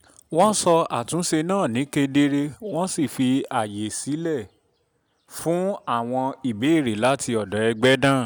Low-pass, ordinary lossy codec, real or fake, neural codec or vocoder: none; none; real; none